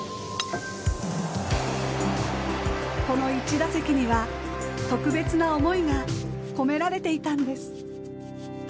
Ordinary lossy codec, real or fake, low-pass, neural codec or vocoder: none; real; none; none